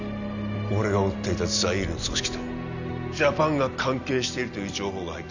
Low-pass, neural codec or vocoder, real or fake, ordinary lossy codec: 7.2 kHz; none; real; none